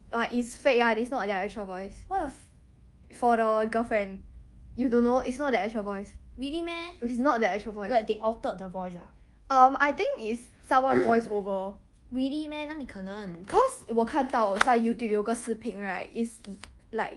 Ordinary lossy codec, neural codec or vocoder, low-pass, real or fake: Opus, 32 kbps; codec, 24 kHz, 1.2 kbps, DualCodec; 10.8 kHz; fake